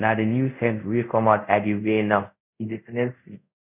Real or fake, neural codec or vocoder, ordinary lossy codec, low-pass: fake; codec, 24 kHz, 0.5 kbps, DualCodec; none; 3.6 kHz